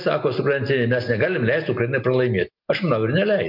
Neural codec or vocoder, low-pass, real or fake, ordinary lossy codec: none; 5.4 kHz; real; MP3, 48 kbps